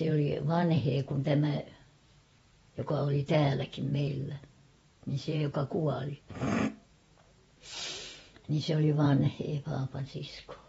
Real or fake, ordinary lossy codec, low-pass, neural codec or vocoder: fake; AAC, 24 kbps; 19.8 kHz; vocoder, 48 kHz, 128 mel bands, Vocos